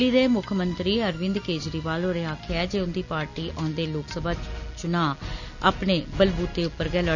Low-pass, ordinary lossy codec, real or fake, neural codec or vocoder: 7.2 kHz; none; real; none